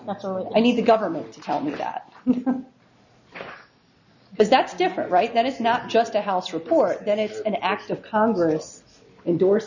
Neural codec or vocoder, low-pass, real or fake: none; 7.2 kHz; real